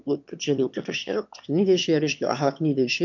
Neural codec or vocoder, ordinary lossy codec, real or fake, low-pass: autoencoder, 22.05 kHz, a latent of 192 numbers a frame, VITS, trained on one speaker; MP3, 64 kbps; fake; 7.2 kHz